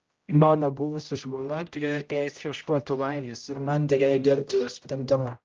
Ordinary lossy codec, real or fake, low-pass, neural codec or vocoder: Opus, 24 kbps; fake; 7.2 kHz; codec, 16 kHz, 0.5 kbps, X-Codec, HuBERT features, trained on general audio